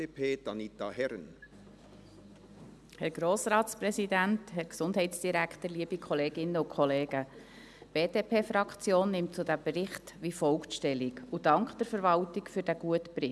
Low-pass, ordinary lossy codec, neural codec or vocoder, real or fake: none; none; none; real